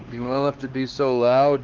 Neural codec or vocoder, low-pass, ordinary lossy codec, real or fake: codec, 16 kHz, 2 kbps, X-Codec, HuBERT features, trained on LibriSpeech; 7.2 kHz; Opus, 16 kbps; fake